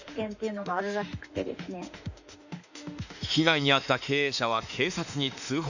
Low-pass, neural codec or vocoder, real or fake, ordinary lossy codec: 7.2 kHz; autoencoder, 48 kHz, 32 numbers a frame, DAC-VAE, trained on Japanese speech; fake; none